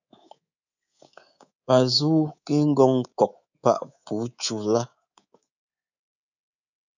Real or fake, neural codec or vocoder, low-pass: fake; codec, 24 kHz, 3.1 kbps, DualCodec; 7.2 kHz